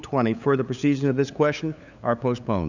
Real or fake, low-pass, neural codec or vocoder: fake; 7.2 kHz; codec, 16 kHz, 8 kbps, FunCodec, trained on LibriTTS, 25 frames a second